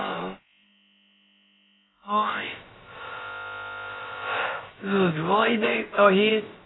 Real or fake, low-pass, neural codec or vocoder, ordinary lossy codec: fake; 7.2 kHz; codec, 16 kHz, about 1 kbps, DyCAST, with the encoder's durations; AAC, 16 kbps